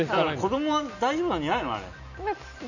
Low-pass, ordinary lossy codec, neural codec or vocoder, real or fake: 7.2 kHz; none; none; real